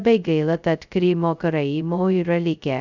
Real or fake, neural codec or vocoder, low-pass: fake; codec, 16 kHz, 0.2 kbps, FocalCodec; 7.2 kHz